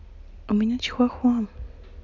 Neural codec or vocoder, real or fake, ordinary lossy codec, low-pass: none; real; none; 7.2 kHz